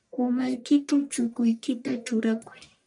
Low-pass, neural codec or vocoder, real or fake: 10.8 kHz; codec, 44.1 kHz, 1.7 kbps, Pupu-Codec; fake